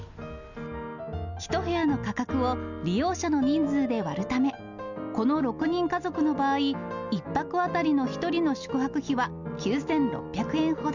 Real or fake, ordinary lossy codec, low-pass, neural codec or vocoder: real; none; 7.2 kHz; none